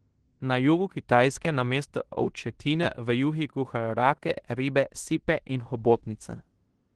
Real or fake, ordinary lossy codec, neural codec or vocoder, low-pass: fake; Opus, 16 kbps; codec, 16 kHz in and 24 kHz out, 0.9 kbps, LongCat-Audio-Codec, fine tuned four codebook decoder; 10.8 kHz